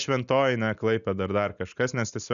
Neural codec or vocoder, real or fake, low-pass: none; real; 7.2 kHz